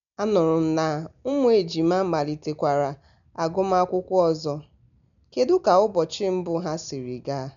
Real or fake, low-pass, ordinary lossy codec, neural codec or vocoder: real; 7.2 kHz; none; none